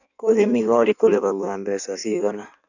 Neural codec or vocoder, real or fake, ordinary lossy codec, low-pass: codec, 16 kHz in and 24 kHz out, 1.1 kbps, FireRedTTS-2 codec; fake; none; 7.2 kHz